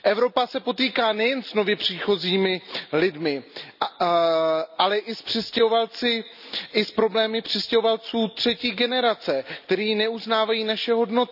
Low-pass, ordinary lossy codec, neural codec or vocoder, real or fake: 5.4 kHz; none; none; real